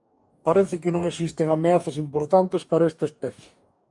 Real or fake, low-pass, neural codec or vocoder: fake; 10.8 kHz; codec, 44.1 kHz, 2.6 kbps, DAC